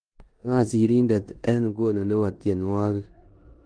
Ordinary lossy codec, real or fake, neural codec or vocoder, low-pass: Opus, 24 kbps; fake; codec, 16 kHz in and 24 kHz out, 0.9 kbps, LongCat-Audio-Codec, four codebook decoder; 9.9 kHz